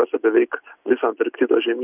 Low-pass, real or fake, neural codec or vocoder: 3.6 kHz; real; none